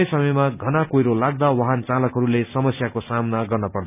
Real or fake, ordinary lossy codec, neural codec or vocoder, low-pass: real; none; none; 3.6 kHz